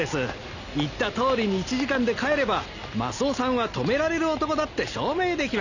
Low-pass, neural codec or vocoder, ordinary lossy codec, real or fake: 7.2 kHz; none; none; real